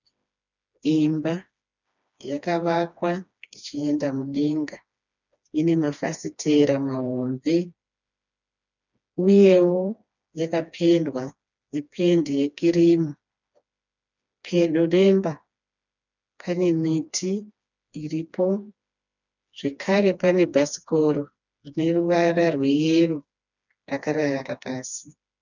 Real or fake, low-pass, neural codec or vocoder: fake; 7.2 kHz; codec, 16 kHz, 2 kbps, FreqCodec, smaller model